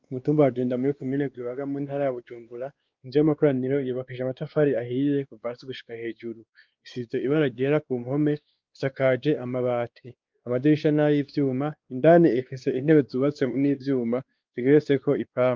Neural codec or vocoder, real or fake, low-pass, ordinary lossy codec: codec, 16 kHz, 2 kbps, X-Codec, WavLM features, trained on Multilingual LibriSpeech; fake; 7.2 kHz; Opus, 24 kbps